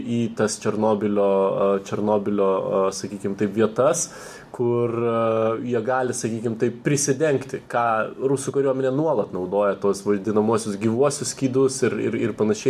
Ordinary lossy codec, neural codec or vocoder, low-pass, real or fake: AAC, 96 kbps; none; 14.4 kHz; real